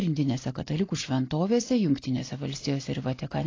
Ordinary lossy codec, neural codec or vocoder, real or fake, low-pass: AAC, 32 kbps; none; real; 7.2 kHz